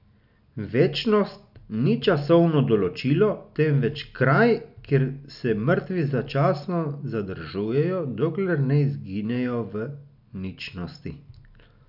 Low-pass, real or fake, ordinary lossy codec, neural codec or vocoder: 5.4 kHz; real; none; none